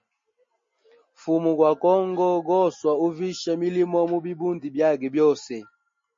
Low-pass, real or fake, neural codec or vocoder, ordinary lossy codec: 7.2 kHz; real; none; MP3, 32 kbps